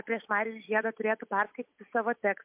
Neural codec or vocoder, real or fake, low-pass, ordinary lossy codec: none; real; 3.6 kHz; MP3, 32 kbps